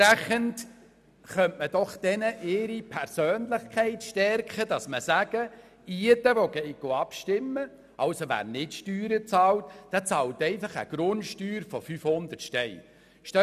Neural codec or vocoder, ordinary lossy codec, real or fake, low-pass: none; none; real; 14.4 kHz